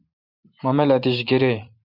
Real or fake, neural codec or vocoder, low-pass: fake; vocoder, 44.1 kHz, 128 mel bands every 512 samples, BigVGAN v2; 5.4 kHz